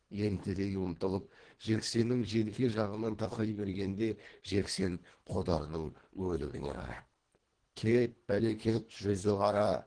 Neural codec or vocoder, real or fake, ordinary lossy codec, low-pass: codec, 24 kHz, 1.5 kbps, HILCodec; fake; Opus, 16 kbps; 9.9 kHz